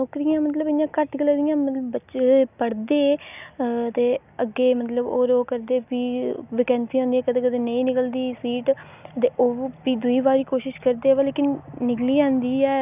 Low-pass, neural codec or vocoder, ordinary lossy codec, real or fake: 3.6 kHz; none; none; real